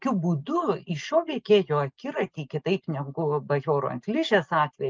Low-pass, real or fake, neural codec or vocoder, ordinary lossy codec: 7.2 kHz; real; none; Opus, 32 kbps